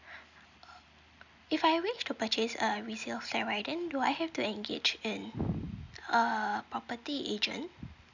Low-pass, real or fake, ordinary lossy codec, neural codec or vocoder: 7.2 kHz; real; none; none